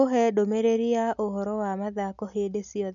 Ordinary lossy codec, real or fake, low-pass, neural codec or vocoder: none; real; 7.2 kHz; none